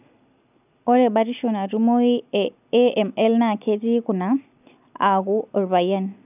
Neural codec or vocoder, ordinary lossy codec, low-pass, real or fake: none; none; 3.6 kHz; real